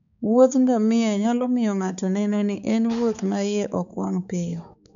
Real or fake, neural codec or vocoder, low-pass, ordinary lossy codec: fake; codec, 16 kHz, 4 kbps, X-Codec, HuBERT features, trained on balanced general audio; 7.2 kHz; none